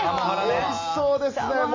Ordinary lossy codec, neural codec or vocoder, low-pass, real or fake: MP3, 32 kbps; none; 7.2 kHz; real